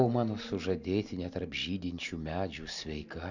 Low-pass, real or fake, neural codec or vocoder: 7.2 kHz; real; none